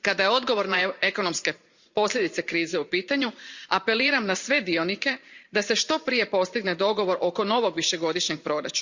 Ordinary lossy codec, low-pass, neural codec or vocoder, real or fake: Opus, 64 kbps; 7.2 kHz; vocoder, 44.1 kHz, 128 mel bands every 512 samples, BigVGAN v2; fake